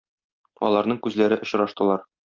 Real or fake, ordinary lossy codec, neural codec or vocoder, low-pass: real; Opus, 24 kbps; none; 7.2 kHz